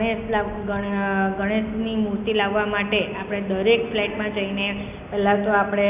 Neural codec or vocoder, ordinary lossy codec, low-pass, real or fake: none; none; 3.6 kHz; real